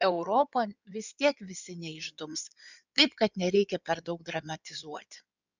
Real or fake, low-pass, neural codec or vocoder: fake; 7.2 kHz; codec, 16 kHz in and 24 kHz out, 2.2 kbps, FireRedTTS-2 codec